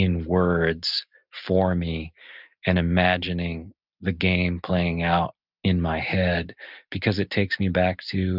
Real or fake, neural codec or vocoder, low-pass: real; none; 5.4 kHz